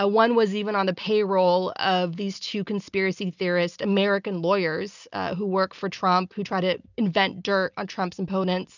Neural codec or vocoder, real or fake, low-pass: none; real; 7.2 kHz